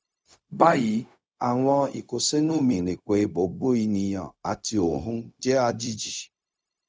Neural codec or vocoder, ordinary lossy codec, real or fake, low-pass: codec, 16 kHz, 0.4 kbps, LongCat-Audio-Codec; none; fake; none